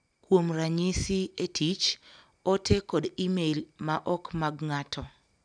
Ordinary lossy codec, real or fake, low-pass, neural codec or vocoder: none; real; 9.9 kHz; none